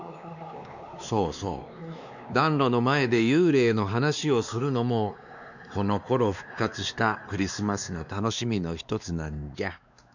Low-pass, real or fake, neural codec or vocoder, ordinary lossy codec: 7.2 kHz; fake; codec, 16 kHz, 2 kbps, X-Codec, WavLM features, trained on Multilingual LibriSpeech; none